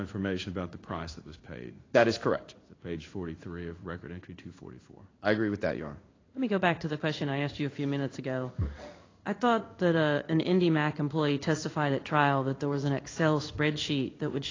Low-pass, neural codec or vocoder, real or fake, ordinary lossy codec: 7.2 kHz; codec, 16 kHz in and 24 kHz out, 1 kbps, XY-Tokenizer; fake; AAC, 32 kbps